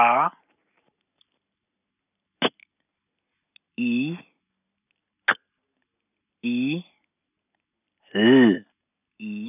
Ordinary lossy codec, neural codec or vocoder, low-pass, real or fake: none; none; 3.6 kHz; real